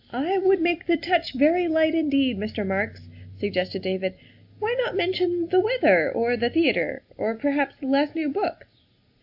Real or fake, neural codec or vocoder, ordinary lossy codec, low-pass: real; none; AAC, 48 kbps; 5.4 kHz